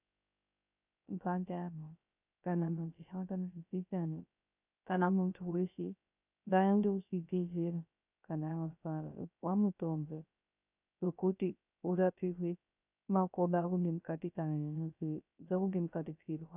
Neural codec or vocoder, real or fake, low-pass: codec, 16 kHz, 0.3 kbps, FocalCodec; fake; 3.6 kHz